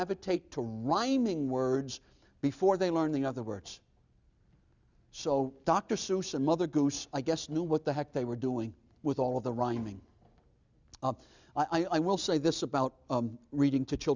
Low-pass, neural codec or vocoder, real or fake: 7.2 kHz; none; real